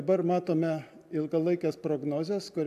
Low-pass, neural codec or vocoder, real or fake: 14.4 kHz; none; real